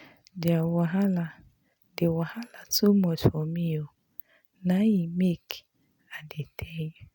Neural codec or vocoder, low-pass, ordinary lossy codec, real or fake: none; 19.8 kHz; none; real